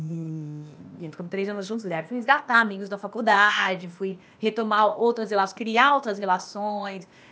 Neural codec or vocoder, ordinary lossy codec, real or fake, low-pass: codec, 16 kHz, 0.8 kbps, ZipCodec; none; fake; none